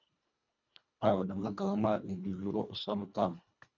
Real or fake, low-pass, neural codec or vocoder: fake; 7.2 kHz; codec, 24 kHz, 1.5 kbps, HILCodec